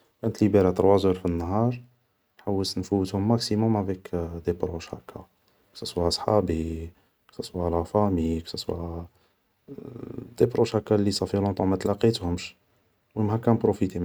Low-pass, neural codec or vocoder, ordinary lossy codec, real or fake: none; none; none; real